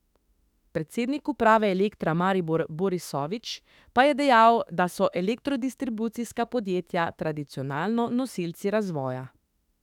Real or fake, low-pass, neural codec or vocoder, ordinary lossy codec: fake; 19.8 kHz; autoencoder, 48 kHz, 32 numbers a frame, DAC-VAE, trained on Japanese speech; none